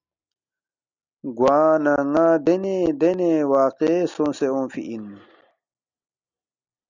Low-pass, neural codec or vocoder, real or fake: 7.2 kHz; none; real